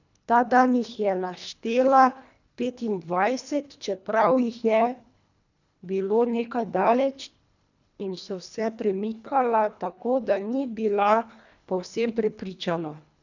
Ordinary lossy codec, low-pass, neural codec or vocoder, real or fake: none; 7.2 kHz; codec, 24 kHz, 1.5 kbps, HILCodec; fake